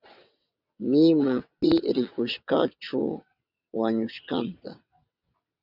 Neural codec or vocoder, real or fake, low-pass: vocoder, 22.05 kHz, 80 mel bands, WaveNeXt; fake; 5.4 kHz